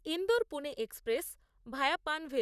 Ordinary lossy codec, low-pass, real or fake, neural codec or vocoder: none; 14.4 kHz; fake; vocoder, 44.1 kHz, 128 mel bands, Pupu-Vocoder